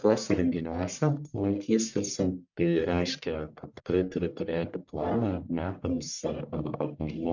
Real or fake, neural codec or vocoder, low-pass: fake; codec, 44.1 kHz, 1.7 kbps, Pupu-Codec; 7.2 kHz